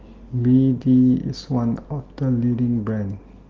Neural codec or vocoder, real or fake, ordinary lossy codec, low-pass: none; real; Opus, 16 kbps; 7.2 kHz